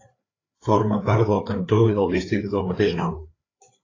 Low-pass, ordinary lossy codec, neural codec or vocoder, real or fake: 7.2 kHz; AAC, 32 kbps; codec, 16 kHz, 4 kbps, FreqCodec, larger model; fake